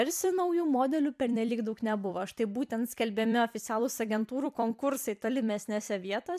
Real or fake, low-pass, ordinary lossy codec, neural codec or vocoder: fake; 14.4 kHz; MP3, 96 kbps; vocoder, 44.1 kHz, 128 mel bands every 256 samples, BigVGAN v2